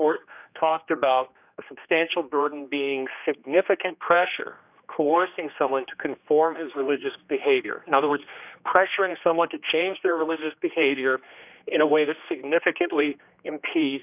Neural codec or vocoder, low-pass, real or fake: codec, 16 kHz, 2 kbps, X-Codec, HuBERT features, trained on general audio; 3.6 kHz; fake